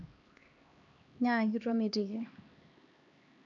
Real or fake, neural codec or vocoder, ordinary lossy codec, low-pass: fake; codec, 16 kHz, 2 kbps, X-Codec, HuBERT features, trained on LibriSpeech; none; 7.2 kHz